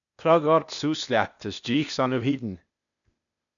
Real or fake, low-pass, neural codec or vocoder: fake; 7.2 kHz; codec, 16 kHz, 0.8 kbps, ZipCodec